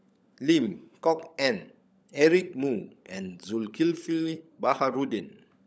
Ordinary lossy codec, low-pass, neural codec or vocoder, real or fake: none; none; codec, 16 kHz, 16 kbps, FunCodec, trained on LibriTTS, 50 frames a second; fake